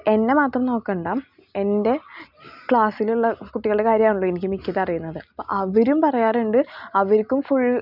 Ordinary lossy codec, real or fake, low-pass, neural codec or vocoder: none; real; 5.4 kHz; none